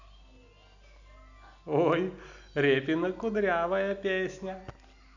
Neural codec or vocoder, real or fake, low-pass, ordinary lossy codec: none; real; 7.2 kHz; none